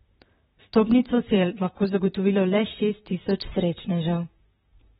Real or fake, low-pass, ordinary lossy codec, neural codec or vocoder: fake; 19.8 kHz; AAC, 16 kbps; vocoder, 44.1 kHz, 128 mel bands, Pupu-Vocoder